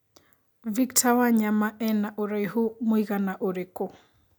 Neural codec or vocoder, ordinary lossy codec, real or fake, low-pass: none; none; real; none